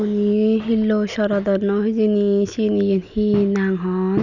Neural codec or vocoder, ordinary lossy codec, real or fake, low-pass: none; none; real; 7.2 kHz